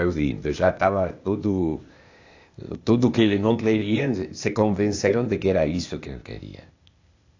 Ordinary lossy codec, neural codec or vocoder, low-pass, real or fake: AAC, 48 kbps; codec, 16 kHz, 0.8 kbps, ZipCodec; 7.2 kHz; fake